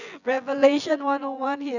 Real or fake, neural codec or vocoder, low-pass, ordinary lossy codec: fake; vocoder, 24 kHz, 100 mel bands, Vocos; 7.2 kHz; none